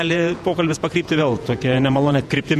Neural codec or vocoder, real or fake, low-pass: vocoder, 48 kHz, 128 mel bands, Vocos; fake; 14.4 kHz